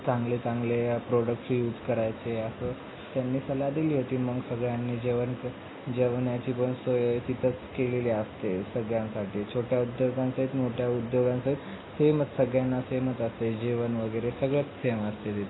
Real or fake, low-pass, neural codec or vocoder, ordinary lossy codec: real; 7.2 kHz; none; AAC, 16 kbps